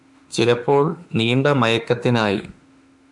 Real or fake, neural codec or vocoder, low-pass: fake; autoencoder, 48 kHz, 32 numbers a frame, DAC-VAE, trained on Japanese speech; 10.8 kHz